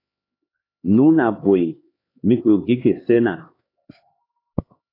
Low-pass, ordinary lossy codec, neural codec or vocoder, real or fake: 5.4 kHz; AAC, 24 kbps; codec, 16 kHz, 4 kbps, X-Codec, HuBERT features, trained on LibriSpeech; fake